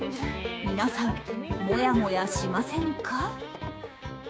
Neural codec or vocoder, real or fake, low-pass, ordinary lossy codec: codec, 16 kHz, 6 kbps, DAC; fake; none; none